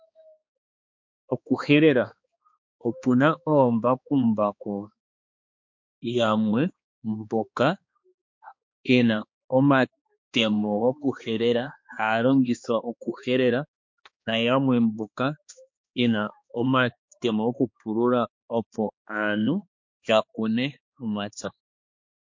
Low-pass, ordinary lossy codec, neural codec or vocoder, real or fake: 7.2 kHz; MP3, 48 kbps; codec, 16 kHz, 2 kbps, X-Codec, HuBERT features, trained on balanced general audio; fake